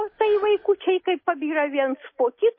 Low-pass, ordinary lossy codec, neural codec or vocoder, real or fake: 5.4 kHz; MP3, 24 kbps; none; real